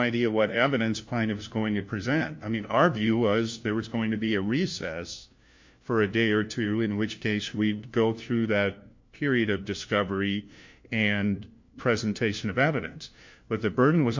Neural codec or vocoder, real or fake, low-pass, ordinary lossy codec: codec, 16 kHz, 1 kbps, FunCodec, trained on LibriTTS, 50 frames a second; fake; 7.2 kHz; MP3, 48 kbps